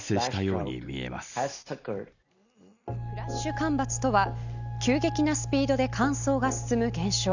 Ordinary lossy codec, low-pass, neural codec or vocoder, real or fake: none; 7.2 kHz; none; real